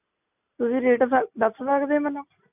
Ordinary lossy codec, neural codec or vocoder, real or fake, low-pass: none; none; real; 3.6 kHz